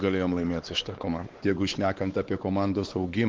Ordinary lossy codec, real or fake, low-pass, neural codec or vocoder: Opus, 16 kbps; fake; 7.2 kHz; codec, 16 kHz, 4 kbps, X-Codec, WavLM features, trained on Multilingual LibriSpeech